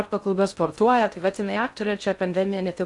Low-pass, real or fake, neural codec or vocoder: 10.8 kHz; fake; codec, 16 kHz in and 24 kHz out, 0.6 kbps, FocalCodec, streaming, 2048 codes